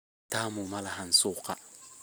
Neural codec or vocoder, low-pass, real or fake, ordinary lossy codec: none; none; real; none